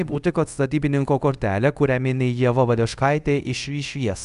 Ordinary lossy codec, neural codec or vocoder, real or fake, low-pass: MP3, 96 kbps; codec, 24 kHz, 0.5 kbps, DualCodec; fake; 10.8 kHz